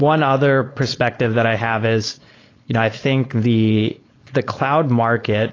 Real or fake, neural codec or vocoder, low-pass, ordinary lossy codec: fake; codec, 16 kHz, 4.8 kbps, FACodec; 7.2 kHz; AAC, 32 kbps